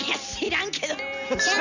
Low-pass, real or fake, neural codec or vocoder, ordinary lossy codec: 7.2 kHz; real; none; none